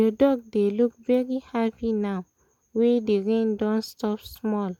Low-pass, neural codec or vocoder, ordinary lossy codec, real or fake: 19.8 kHz; none; MP3, 96 kbps; real